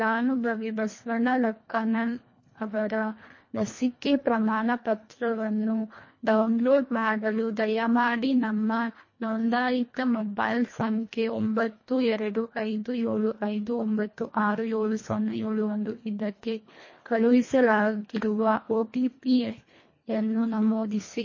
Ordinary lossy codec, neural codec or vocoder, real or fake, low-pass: MP3, 32 kbps; codec, 24 kHz, 1.5 kbps, HILCodec; fake; 7.2 kHz